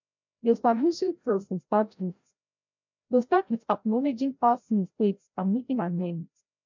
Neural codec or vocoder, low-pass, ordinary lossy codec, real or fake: codec, 16 kHz, 0.5 kbps, FreqCodec, larger model; 7.2 kHz; MP3, 64 kbps; fake